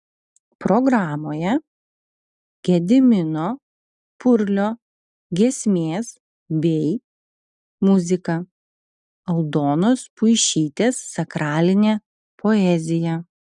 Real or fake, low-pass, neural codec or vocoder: real; 10.8 kHz; none